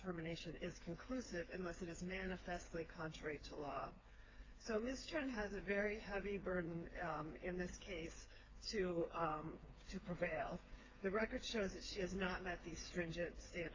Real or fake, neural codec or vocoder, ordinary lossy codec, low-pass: fake; codec, 16 kHz, 4 kbps, FreqCodec, smaller model; AAC, 32 kbps; 7.2 kHz